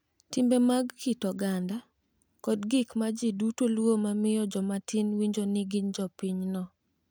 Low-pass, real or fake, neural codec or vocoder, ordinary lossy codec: none; real; none; none